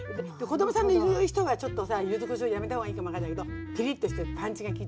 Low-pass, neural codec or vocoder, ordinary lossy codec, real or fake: none; none; none; real